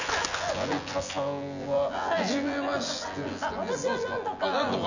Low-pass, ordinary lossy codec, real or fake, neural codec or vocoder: 7.2 kHz; none; fake; vocoder, 24 kHz, 100 mel bands, Vocos